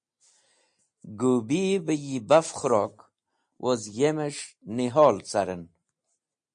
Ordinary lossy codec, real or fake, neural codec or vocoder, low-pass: MP3, 48 kbps; real; none; 9.9 kHz